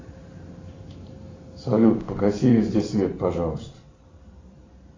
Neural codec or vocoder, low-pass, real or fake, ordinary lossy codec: vocoder, 44.1 kHz, 128 mel bands every 512 samples, BigVGAN v2; 7.2 kHz; fake; AAC, 32 kbps